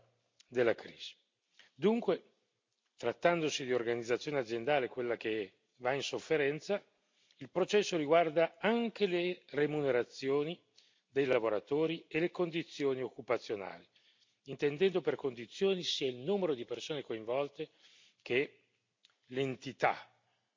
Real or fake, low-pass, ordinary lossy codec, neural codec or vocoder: real; 7.2 kHz; MP3, 64 kbps; none